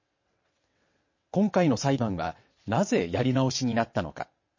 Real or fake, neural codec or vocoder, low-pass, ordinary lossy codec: fake; vocoder, 22.05 kHz, 80 mel bands, WaveNeXt; 7.2 kHz; MP3, 32 kbps